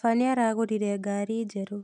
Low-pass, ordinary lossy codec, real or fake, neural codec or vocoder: 10.8 kHz; none; real; none